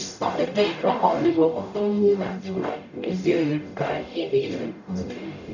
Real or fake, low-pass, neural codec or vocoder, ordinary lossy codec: fake; 7.2 kHz; codec, 44.1 kHz, 0.9 kbps, DAC; none